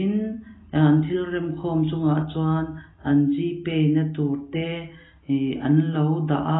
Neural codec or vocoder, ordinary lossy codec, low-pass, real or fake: none; AAC, 16 kbps; 7.2 kHz; real